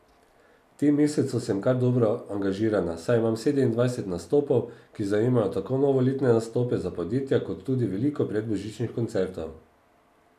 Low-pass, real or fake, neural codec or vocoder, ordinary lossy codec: 14.4 kHz; real; none; none